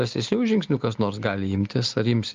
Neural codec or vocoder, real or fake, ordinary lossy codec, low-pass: none; real; Opus, 32 kbps; 7.2 kHz